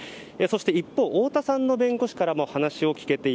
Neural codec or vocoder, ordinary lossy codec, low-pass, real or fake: none; none; none; real